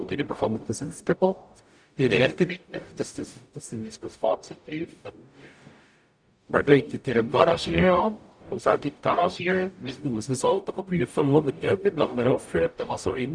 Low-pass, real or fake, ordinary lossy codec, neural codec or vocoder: 9.9 kHz; fake; none; codec, 44.1 kHz, 0.9 kbps, DAC